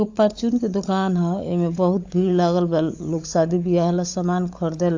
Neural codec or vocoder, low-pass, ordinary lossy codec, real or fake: codec, 16 kHz, 16 kbps, FunCodec, trained on LibriTTS, 50 frames a second; 7.2 kHz; AAC, 48 kbps; fake